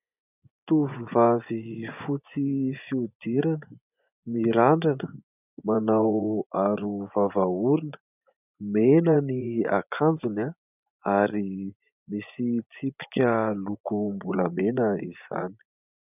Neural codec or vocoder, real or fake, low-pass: vocoder, 24 kHz, 100 mel bands, Vocos; fake; 3.6 kHz